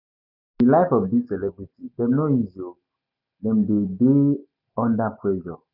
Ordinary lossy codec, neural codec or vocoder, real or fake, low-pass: none; none; real; 5.4 kHz